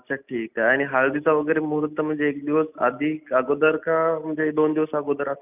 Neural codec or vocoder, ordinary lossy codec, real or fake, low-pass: none; none; real; 3.6 kHz